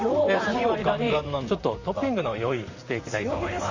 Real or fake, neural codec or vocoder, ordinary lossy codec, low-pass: fake; vocoder, 44.1 kHz, 128 mel bands, Pupu-Vocoder; Opus, 64 kbps; 7.2 kHz